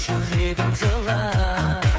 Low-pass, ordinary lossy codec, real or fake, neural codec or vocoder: none; none; fake; codec, 16 kHz, 8 kbps, FreqCodec, smaller model